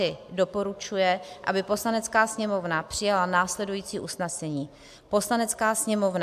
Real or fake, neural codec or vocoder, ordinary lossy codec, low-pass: real; none; AAC, 96 kbps; 14.4 kHz